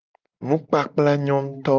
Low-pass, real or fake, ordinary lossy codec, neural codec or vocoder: 7.2 kHz; real; Opus, 32 kbps; none